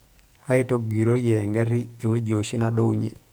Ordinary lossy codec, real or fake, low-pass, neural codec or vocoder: none; fake; none; codec, 44.1 kHz, 2.6 kbps, SNAC